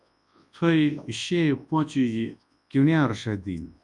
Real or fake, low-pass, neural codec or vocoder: fake; 10.8 kHz; codec, 24 kHz, 0.9 kbps, WavTokenizer, large speech release